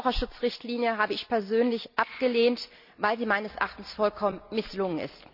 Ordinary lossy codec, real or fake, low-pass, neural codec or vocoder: none; real; 5.4 kHz; none